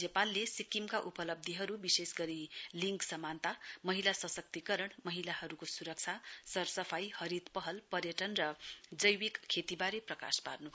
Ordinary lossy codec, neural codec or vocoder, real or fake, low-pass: none; none; real; none